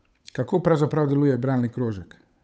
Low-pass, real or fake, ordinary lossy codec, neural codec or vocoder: none; fake; none; codec, 16 kHz, 8 kbps, FunCodec, trained on Chinese and English, 25 frames a second